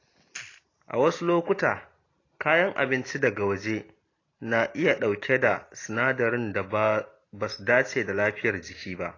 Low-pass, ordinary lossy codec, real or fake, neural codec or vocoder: 7.2 kHz; AAC, 32 kbps; real; none